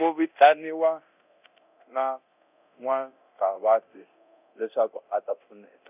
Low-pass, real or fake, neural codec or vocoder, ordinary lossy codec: 3.6 kHz; fake; codec, 24 kHz, 0.9 kbps, DualCodec; none